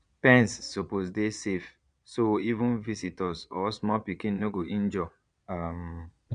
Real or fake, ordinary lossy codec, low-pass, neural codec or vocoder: fake; none; 9.9 kHz; vocoder, 22.05 kHz, 80 mel bands, Vocos